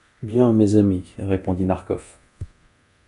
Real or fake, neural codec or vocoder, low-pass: fake; codec, 24 kHz, 0.9 kbps, DualCodec; 10.8 kHz